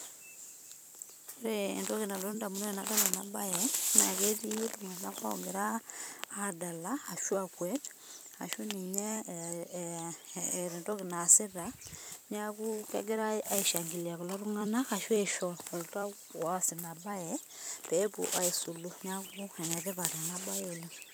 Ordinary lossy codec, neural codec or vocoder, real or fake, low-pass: none; vocoder, 44.1 kHz, 128 mel bands every 256 samples, BigVGAN v2; fake; none